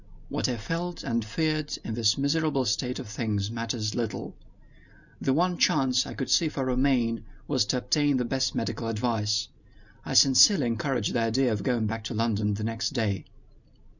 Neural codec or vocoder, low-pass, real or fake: none; 7.2 kHz; real